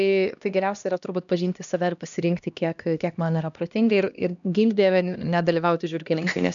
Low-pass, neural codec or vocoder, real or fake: 7.2 kHz; codec, 16 kHz, 1 kbps, X-Codec, HuBERT features, trained on LibriSpeech; fake